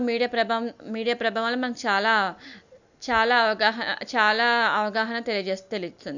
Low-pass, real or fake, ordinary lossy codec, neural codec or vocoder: 7.2 kHz; real; none; none